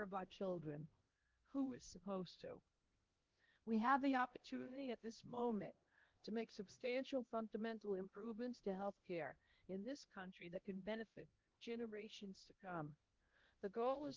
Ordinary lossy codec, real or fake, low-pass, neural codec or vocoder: Opus, 16 kbps; fake; 7.2 kHz; codec, 16 kHz, 1 kbps, X-Codec, HuBERT features, trained on LibriSpeech